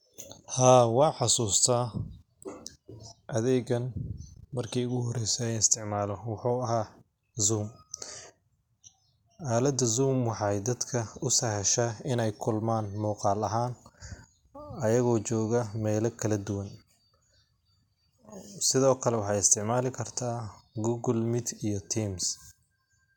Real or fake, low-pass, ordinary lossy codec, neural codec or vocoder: real; 19.8 kHz; none; none